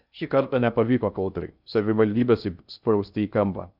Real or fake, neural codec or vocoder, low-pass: fake; codec, 16 kHz in and 24 kHz out, 0.6 kbps, FocalCodec, streaming, 2048 codes; 5.4 kHz